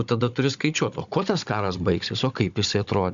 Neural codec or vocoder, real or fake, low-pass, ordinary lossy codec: codec, 16 kHz, 16 kbps, FunCodec, trained on Chinese and English, 50 frames a second; fake; 7.2 kHz; Opus, 64 kbps